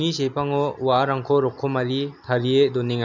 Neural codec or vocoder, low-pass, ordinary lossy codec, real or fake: none; 7.2 kHz; none; real